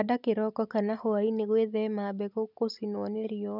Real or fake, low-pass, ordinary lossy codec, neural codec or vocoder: real; 5.4 kHz; none; none